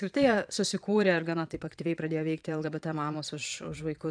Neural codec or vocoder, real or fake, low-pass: vocoder, 22.05 kHz, 80 mel bands, Vocos; fake; 9.9 kHz